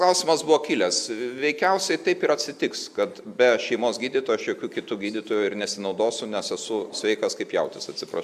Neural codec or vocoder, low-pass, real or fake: none; 14.4 kHz; real